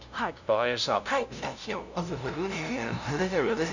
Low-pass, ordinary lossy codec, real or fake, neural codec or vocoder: 7.2 kHz; none; fake; codec, 16 kHz, 0.5 kbps, FunCodec, trained on LibriTTS, 25 frames a second